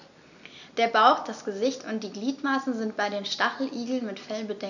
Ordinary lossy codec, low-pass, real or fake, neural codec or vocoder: none; 7.2 kHz; real; none